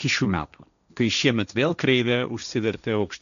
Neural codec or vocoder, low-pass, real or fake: codec, 16 kHz, 1.1 kbps, Voila-Tokenizer; 7.2 kHz; fake